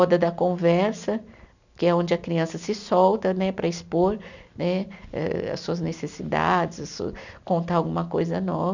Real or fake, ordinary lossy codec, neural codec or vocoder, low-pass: real; none; none; 7.2 kHz